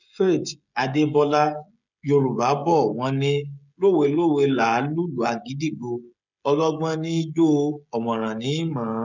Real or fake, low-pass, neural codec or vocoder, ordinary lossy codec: fake; 7.2 kHz; codec, 16 kHz, 16 kbps, FreqCodec, smaller model; none